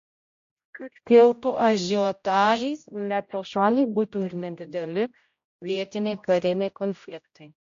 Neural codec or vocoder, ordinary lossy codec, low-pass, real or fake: codec, 16 kHz, 0.5 kbps, X-Codec, HuBERT features, trained on general audio; AAC, 48 kbps; 7.2 kHz; fake